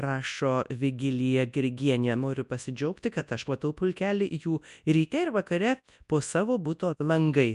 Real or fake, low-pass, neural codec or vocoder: fake; 10.8 kHz; codec, 24 kHz, 0.9 kbps, WavTokenizer, large speech release